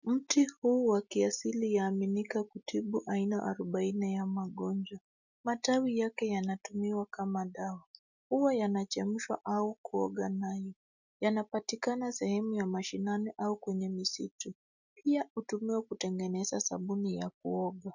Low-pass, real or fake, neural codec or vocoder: 7.2 kHz; real; none